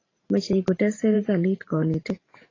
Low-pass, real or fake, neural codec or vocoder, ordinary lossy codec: 7.2 kHz; fake; vocoder, 44.1 kHz, 128 mel bands every 512 samples, BigVGAN v2; AAC, 32 kbps